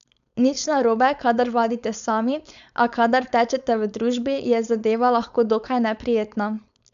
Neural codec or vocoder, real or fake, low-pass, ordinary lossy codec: codec, 16 kHz, 4.8 kbps, FACodec; fake; 7.2 kHz; none